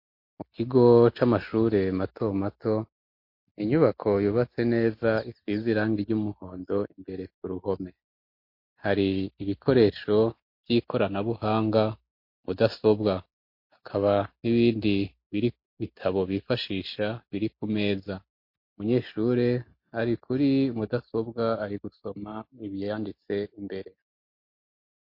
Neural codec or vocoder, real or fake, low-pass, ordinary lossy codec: none; real; 5.4 kHz; MP3, 32 kbps